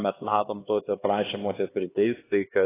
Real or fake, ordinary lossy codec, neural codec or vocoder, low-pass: fake; AAC, 16 kbps; codec, 16 kHz, 2 kbps, X-Codec, HuBERT features, trained on LibriSpeech; 3.6 kHz